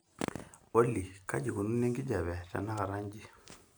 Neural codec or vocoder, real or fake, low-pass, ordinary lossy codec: none; real; none; none